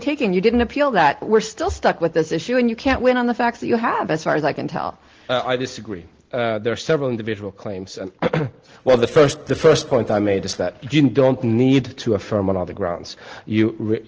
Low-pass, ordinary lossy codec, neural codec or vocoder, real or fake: 7.2 kHz; Opus, 16 kbps; none; real